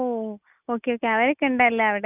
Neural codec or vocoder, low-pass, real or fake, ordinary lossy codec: none; 3.6 kHz; real; none